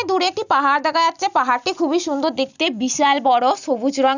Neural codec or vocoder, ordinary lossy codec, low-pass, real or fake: none; none; 7.2 kHz; real